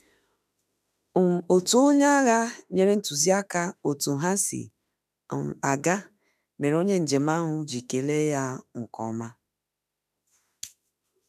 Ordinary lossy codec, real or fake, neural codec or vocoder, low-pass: none; fake; autoencoder, 48 kHz, 32 numbers a frame, DAC-VAE, trained on Japanese speech; 14.4 kHz